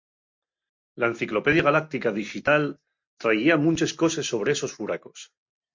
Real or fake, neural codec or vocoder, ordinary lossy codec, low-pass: real; none; MP3, 48 kbps; 7.2 kHz